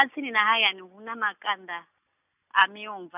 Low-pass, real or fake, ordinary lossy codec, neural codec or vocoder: 3.6 kHz; real; none; none